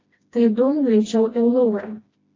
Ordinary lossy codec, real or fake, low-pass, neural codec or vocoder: AAC, 32 kbps; fake; 7.2 kHz; codec, 16 kHz, 1 kbps, FreqCodec, smaller model